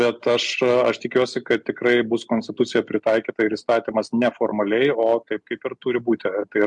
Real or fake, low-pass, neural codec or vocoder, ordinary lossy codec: real; 10.8 kHz; none; MP3, 64 kbps